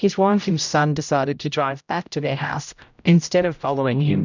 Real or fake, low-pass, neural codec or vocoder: fake; 7.2 kHz; codec, 16 kHz, 0.5 kbps, X-Codec, HuBERT features, trained on general audio